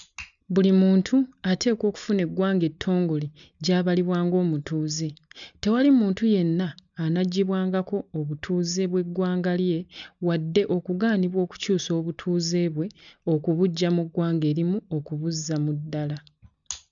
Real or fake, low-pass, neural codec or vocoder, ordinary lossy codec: real; 7.2 kHz; none; none